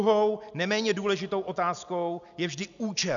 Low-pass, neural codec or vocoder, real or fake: 7.2 kHz; none; real